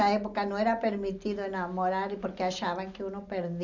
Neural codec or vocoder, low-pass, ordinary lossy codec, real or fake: none; 7.2 kHz; none; real